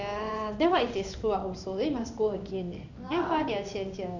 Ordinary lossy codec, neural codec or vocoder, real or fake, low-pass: none; codec, 16 kHz in and 24 kHz out, 1 kbps, XY-Tokenizer; fake; 7.2 kHz